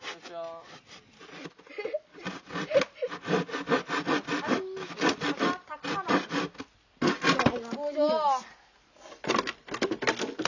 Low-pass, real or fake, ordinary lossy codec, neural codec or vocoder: 7.2 kHz; real; none; none